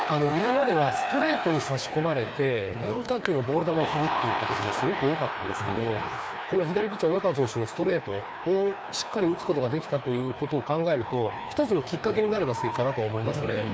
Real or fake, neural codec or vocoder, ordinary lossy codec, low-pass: fake; codec, 16 kHz, 2 kbps, FreqCodec, larger model; none; none